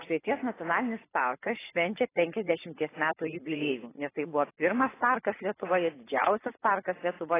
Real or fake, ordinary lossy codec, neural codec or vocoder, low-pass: fake; AAC, 16 kbps; vocoder, 24 kHz, 100 mel bands, Vocos; 3.6 kHz